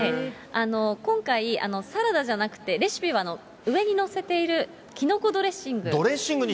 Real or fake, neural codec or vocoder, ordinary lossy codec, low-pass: real; none; none; none